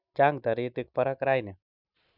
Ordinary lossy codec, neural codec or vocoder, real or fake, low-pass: none; none; real; 5.4 kHz